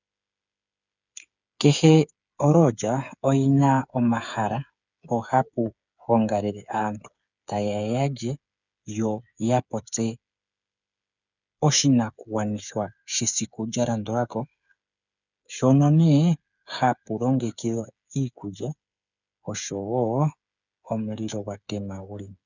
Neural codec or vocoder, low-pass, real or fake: codec, 16 kHz, 8 kbps, FreqCodec, smaller model; 7.2 kHz; fake